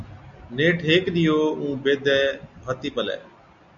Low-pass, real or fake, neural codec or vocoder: 7.2 kHz; real; none